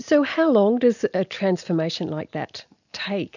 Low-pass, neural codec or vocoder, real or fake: 7.2 kHz; none; real